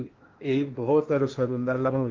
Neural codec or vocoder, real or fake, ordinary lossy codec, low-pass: codec, 16 kHz in and 24 kHz out, 0.8 kbps, FocalCodec, streaming, 65536 codes; fake; Opus, 32 kbps; 7.2 kHz